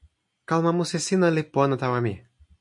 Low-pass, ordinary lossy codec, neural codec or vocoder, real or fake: 10.8 kHz; MP3, 48 kbps; none; real